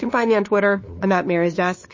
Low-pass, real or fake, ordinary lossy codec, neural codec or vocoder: 7.2 kHz; fake; MP3, 32 kbps; codec, 16 kHz, 2 kbps, FunCodec, trained on LibriTTS, 25 frames a second